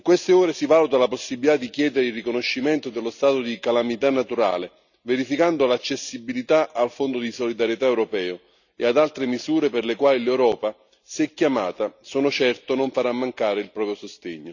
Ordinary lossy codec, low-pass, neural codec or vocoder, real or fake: none; 7.2 kHz; none; real